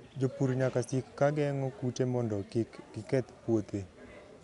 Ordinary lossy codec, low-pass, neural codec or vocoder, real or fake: none; 10.8 kHz; none; real